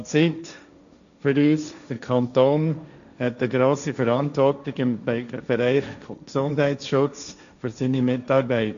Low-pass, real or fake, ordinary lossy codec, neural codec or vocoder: 7.2 kHz; fake; none; codec, 16 kHz, 1.1 kbps, Voila-Tokenizer